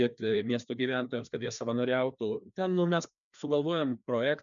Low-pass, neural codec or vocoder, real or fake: 7.2 kHz; codec, 16 kHz, 2 kbps, FreqCodec, larger model; fake